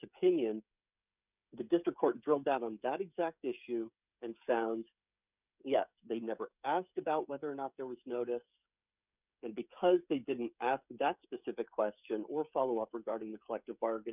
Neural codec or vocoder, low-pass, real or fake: codec, 16 kHz, 16 kbps, FreqCodec, smaller model; 3.6 kHz; fake